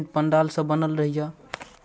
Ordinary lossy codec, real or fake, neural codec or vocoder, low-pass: none; real; none; none